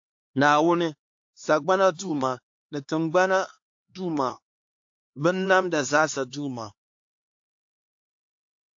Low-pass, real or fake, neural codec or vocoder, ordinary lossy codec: 7.2 kHz; fake; codec, 16 kHz, 4 kbps, X-Codec, HuBERT features, trained on LibriSpeech; AAC, 48 kbps